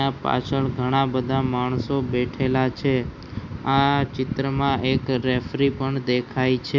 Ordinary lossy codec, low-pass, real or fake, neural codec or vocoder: none; 7.2 kHz; real; none